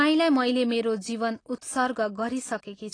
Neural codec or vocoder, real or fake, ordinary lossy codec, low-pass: none; real; AAC, 32 kbps; 9.9 kHz